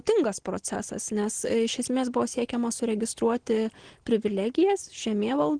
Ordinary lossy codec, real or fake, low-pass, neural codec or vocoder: Opus, 16 kbps; real; 9.9 kHz; none